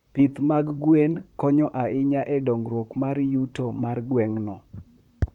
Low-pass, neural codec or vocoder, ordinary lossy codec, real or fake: 19.8 kHz; vocoder, 44.1 kHz, 128 mel bands, Pupu-Vocoder; MP3, 96 kbps; fake